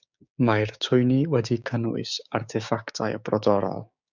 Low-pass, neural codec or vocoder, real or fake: 7.2 kHz; codec, 16 kHz, 6 kbps, DAC; fake